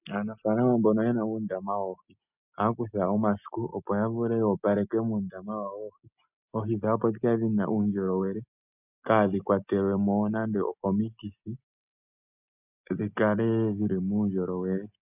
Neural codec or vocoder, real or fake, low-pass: none; real; 3.6 kHz